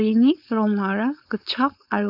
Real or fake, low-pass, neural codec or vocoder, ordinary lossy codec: fake; 5.4 kHz; codec, 16 kHz, 4.8 kbps, FACodec; none